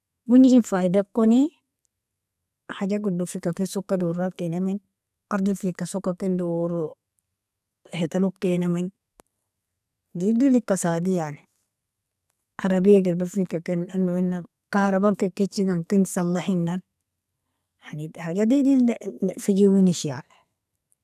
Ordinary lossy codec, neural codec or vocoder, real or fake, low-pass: none; codec, 44.1 kHz, 2.6 kbps, SNAC; fake; 14.4 kHz